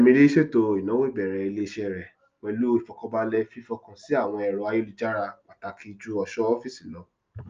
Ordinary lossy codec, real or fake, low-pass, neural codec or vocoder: Opus, 24 kbps; real; 7.2 kHz; none